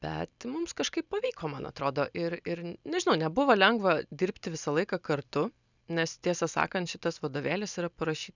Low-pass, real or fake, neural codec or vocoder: 7.2 kHz; real; none